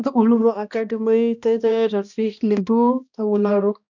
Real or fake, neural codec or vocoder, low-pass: fake; codec, 16 kHz, 1 kbps, X-Codec, HuBERT features, trained on balanced general audio; 7.2 kHz